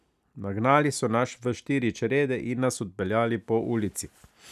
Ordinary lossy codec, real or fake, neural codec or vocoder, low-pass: none; real; none; 14.4 kHz